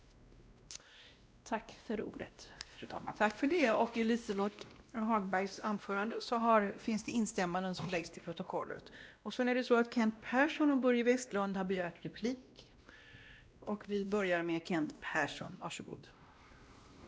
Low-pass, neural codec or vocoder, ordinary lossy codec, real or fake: none; codec, 16 kHz, 1 kbps, X-Codec, WavLM features, trained on Multilingual LibriSpeech; none; fake